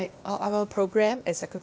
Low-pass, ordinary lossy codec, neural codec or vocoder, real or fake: none; none; codec, 16 kHz, 0.8 kbps, ZipCodec; fake